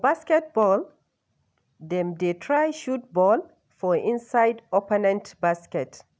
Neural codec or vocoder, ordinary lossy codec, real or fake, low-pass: none; none; real; none